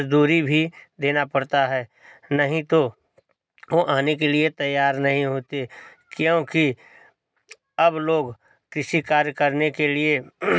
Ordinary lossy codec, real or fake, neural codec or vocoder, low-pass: none; real; none; none